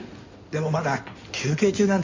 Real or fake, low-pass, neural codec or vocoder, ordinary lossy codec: fake; 7.2 kHz; codec, 16 kHz in and 24 kHz out, 2.2 kbps, FireRedTTS-2 codec; MP3, 48 kbps